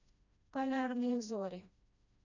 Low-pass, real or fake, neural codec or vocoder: 7.2 kHz; fake; codec, 16 kHz, 1 kbps, FreqCodec, smaller model